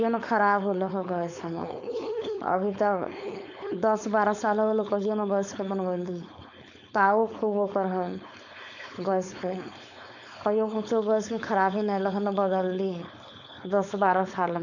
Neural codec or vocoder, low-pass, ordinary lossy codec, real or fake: codec, 16 kHz, 4.8 kbps, FACodec; 7.2 kHz; none; fake